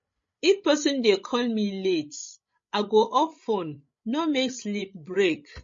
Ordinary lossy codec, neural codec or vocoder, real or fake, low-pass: MP3, 32 kbps; codec, 16 kHz, 16 kbps, FreqCodec, larger model; fake; 7.2 kHz